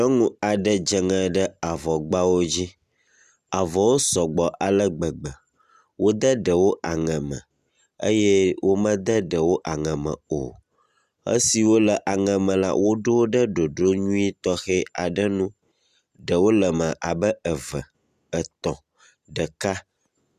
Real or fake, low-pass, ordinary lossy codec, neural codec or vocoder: real; 14.4 kHz; Opus, 64 kbps; none